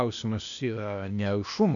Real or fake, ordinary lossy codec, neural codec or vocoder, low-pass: fake; AAC, 64 kbps; codec, 16 kHz, 0.8 kbps, ZipCodec; 7.2 kHz